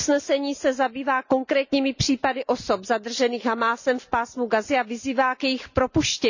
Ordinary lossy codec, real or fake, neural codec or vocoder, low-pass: none; real; none; 7.2 kHz